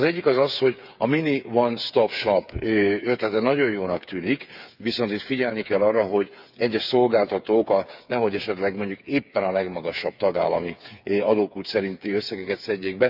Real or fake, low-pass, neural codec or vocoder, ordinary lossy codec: fake; 5.4 kHz; codec, 16 kHz, 8 kbps, FreqCodec, smaller model; none